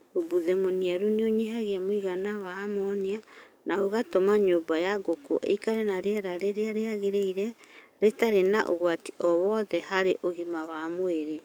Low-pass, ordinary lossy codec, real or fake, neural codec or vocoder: none; none; fake; codec, 44.1 kHz, 7.8 kbps, DAC